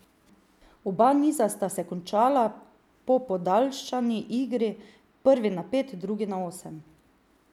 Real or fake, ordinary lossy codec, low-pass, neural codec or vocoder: real; none; 19.8 kHz; none